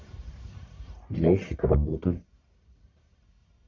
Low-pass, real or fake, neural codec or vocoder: 7.2 kHz; fake; codec, 44.1 kHz, 1.7 kbps, Pupu-Codec